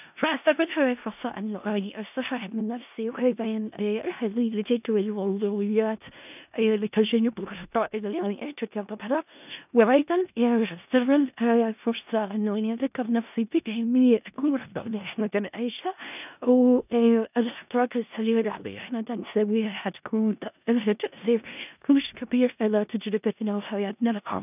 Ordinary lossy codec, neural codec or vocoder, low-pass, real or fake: none; codec, 16 kHz in and 24 kHz out, 0.4 kbps, LongCat-Audio-Codec, four codebook decoder; 3.6 kHz; fake